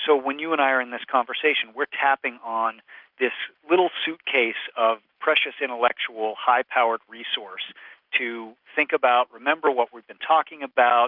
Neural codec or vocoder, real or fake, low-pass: none; real; 5.4 kHz